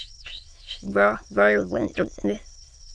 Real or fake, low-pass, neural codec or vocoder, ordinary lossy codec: fake; 9.9 kHz; autoencoder, 22.05 kHz, a latent of 192 numbers a frame, VITS, trained on many speakers; AAC, 64 kbps